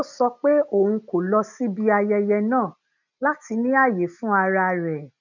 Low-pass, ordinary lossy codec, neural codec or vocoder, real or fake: 7.2 kHz; none; none; real